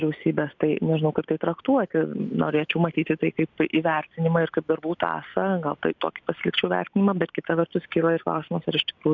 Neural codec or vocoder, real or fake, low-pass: none; real; 7.2 kHz